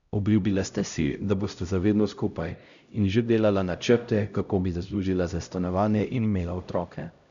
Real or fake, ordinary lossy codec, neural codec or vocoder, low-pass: fake; none; codec, 16 kHz, 0.5 kbps, X-Codec, HuBERT features, trained on LibriSpeech; 7.2 kHz